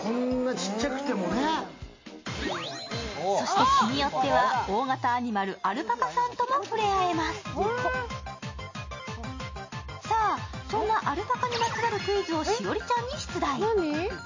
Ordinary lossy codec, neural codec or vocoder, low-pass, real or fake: MP3, 32 kbps; none; 7.2 kHz; real